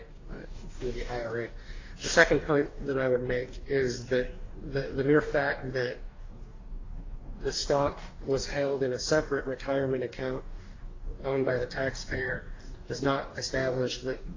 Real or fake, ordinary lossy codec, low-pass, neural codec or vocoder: fake; AAC, 32 kbps; 7.2 kHz; codec, 44.1 kHz, 2.6 kbps, DAC